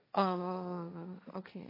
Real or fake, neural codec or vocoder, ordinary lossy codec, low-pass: fake; codec, 16 kHz, 1.1 kbps, Voila-Tokenizer; MP3, 32 kbps; 5.4 kHz